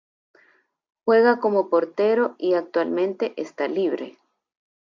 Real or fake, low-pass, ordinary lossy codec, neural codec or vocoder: real; 7.2 kHz; MP3, 64 kbps; none